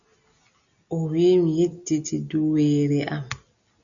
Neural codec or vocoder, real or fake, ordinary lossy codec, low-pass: none; real; MP3, 96 kbps; 7.2 kHz